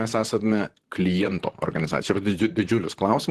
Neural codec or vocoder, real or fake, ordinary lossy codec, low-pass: vocoder, 44.1 kHz, 128 mel bands, Pupu-Vocoder; fake; Opus, 16 kbps; 14.4 kHz